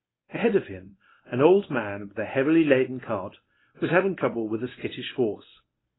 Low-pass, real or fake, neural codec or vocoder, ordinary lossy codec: 7.2 kHz; fake; codec, 24 kHz, 0.9 kbps, WavTokenizer, medium speech release version 1; AAC, 16 kbps